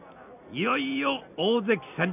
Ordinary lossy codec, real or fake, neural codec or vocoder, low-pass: none; real; none; 3.6 kHz